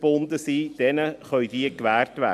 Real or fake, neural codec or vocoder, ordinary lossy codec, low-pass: real; none; AAC, 96 kbps; 14.4 kHz